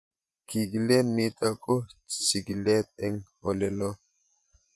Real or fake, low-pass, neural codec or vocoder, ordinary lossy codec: fake; none; vocoder, 24 kHz, 100 mel bands, Vocos; none